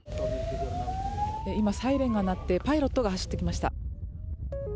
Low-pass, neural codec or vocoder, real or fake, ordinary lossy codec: none; none; real; none